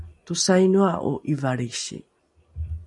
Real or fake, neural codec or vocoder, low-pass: real; none; 10.8 kHz